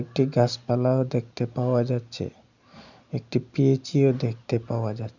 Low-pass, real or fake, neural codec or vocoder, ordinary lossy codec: 7.2 kHz; real; none; none